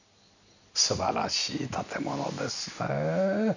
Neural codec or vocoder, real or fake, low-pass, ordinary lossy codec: none; real; 7.2 kHz; none